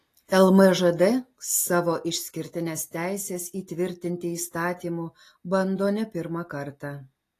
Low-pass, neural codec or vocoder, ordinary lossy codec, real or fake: 14.4 kHz; none; AAC, 48 kbps; real